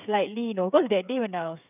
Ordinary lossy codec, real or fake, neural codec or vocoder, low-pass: none; fake; codec, 16 kHz, 8 kbps, FreqCodec, smaller model; 3.6 kHz